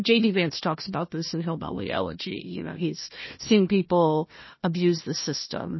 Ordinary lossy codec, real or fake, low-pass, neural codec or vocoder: MP3, 24 kbps; fake; 7.2 kHz; codec, 16 kHz, 1 kbps, FunCodec, trained on Chinese and English, 50 frames a second